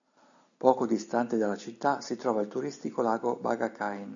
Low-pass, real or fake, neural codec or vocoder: 7.2 kHz; real; none